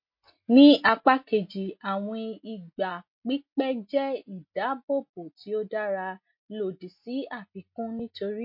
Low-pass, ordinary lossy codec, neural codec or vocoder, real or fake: 5.4 kHz; MP3, 32 kbps; none; real